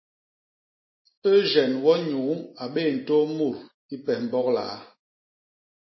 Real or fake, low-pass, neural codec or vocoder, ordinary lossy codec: real; 7.2 kHz; none; MP3, 24 kbps